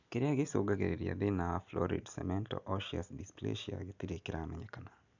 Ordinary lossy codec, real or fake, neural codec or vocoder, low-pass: none; real; none; 7.2 kHz